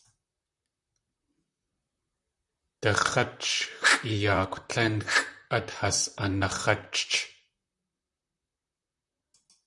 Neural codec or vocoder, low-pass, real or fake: vocoder, 44.1 kHz, 128 mel bands, Pupu-Vocoder; 10.8 kHz; fake